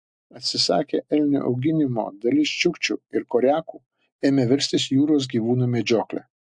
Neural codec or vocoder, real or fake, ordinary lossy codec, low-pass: none; real; MP3, 64 kbps; 9.9 kHz